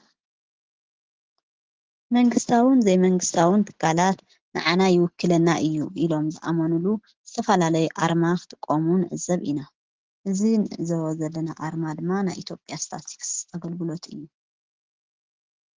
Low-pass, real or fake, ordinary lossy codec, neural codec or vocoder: 7.2 kHz; real; Opus, 16 kbps; none